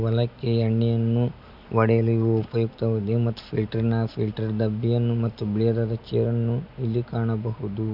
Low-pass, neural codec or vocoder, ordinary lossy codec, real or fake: 5.4 kHz; none; none; real